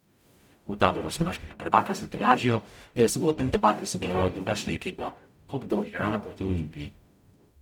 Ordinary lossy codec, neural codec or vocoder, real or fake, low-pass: none; codec, 44.1 kHz, 0.9 kbps, DAC; fake; 19.8 kHz